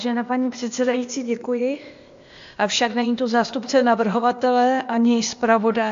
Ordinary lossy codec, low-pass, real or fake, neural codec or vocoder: AAC, 64 kbps; 7.2 kHz; fake; codec, 16 kHz, 0.8 kbps, ZipCodec